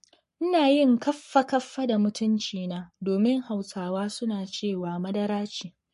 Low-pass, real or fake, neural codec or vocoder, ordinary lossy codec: 14.4 kHz; fake; codec, 44.1 kHz, 7.8 kbps, Pupu-Codec; MP3, 48 kbps